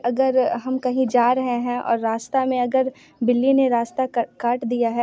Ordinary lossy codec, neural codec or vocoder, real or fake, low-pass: none; none; real; none